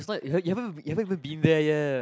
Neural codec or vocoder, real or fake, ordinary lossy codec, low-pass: none; real; none; none